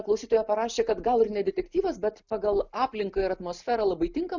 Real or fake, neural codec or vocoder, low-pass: real; none; 7.2 kHz